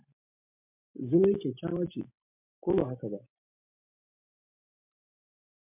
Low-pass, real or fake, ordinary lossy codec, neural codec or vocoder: 3.6 kHz; real; MP3, 32 kbps; none